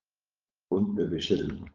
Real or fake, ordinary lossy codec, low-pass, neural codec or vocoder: fake; Opus, 24 kbps; 7.2 kHz; codec, 16 kHz, 4.8 kbps, FACodec